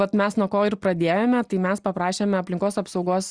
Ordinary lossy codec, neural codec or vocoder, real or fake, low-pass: Opus, 64 kbps; none; real; 9.9 kHz